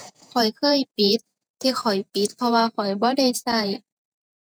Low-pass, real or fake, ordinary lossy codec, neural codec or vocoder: none; fake; none; vocoder, 48 kHz, 128 mel bands, Vocos